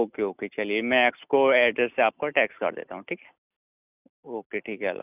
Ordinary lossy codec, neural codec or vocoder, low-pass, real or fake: none; none; 3.6 kHz; real